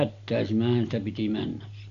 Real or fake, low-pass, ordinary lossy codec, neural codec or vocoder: real; 7.2 kHz; none; none